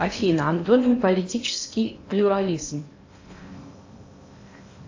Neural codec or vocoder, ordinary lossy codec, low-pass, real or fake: codec, 16 kHz in and 24 kHz out, 0.8 kbps, FocalCodec, streaming, 65536 codes; AAC, 48 kbps; 7.2 kHz; fake